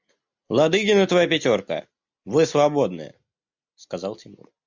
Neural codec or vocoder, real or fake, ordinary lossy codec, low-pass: none; real; MP3, 64 kbps; 7.2 kHz